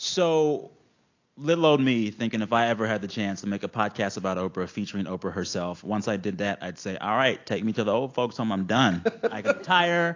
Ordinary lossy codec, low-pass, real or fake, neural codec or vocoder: AAC, 48 kbps; 7.2 kHz; real; none